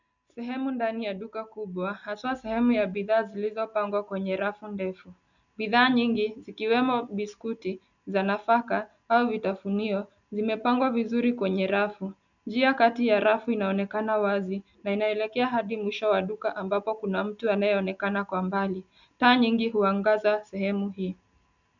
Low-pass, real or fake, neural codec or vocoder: 7.2 kHz; real; none